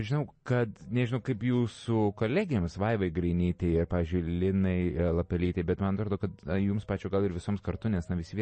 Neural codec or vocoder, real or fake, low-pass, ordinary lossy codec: none; real; 9.9 kHz; MP3, 32 kbps